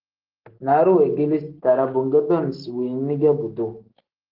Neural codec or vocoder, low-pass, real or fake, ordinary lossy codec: none; 5.4 kHz; real; Opus, 16 kbps